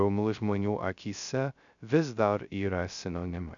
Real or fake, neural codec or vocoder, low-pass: fake; codec, 16 kHz, 0.2 kbps, FocalCodec; 7.2 kHz